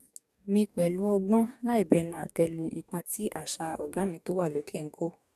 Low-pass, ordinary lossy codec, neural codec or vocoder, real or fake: 14.4 kHz; none; codec, 44.1 kHz, 2.6 kbps, DAC; fake